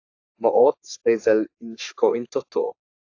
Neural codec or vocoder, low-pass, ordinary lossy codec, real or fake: codec, 44.1 kHz, 3.4 kbps, Pupu-Codec; 7.2 kHz; AAC, 48 kbps; fake